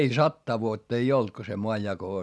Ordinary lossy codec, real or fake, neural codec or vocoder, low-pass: none; real; none; none